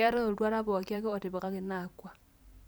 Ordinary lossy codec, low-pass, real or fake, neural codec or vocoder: none; none; fake; vocoder, 44.1 kHz, 128 mel bands every 512 samples, BigVGAN v2